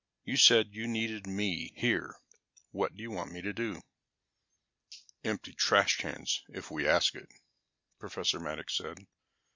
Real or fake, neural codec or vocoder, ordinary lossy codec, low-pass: real; none; MP3, 64 kbps; 7.2 kHz